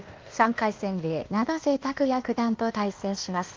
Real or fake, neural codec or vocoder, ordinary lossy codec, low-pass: fake; codec, 16 kHz, 0.8 kbps, ZipCodec; Opus, 32 kbps; 7.2 kHz